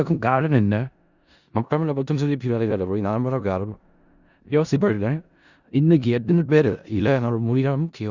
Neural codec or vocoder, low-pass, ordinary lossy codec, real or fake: codec, 16 kHz in and 24 kHz out, 0.4 kbps, LongCat-Audio-Codec, four codebook decoder; 7.2 kHz; Opus, 64 kbps; fake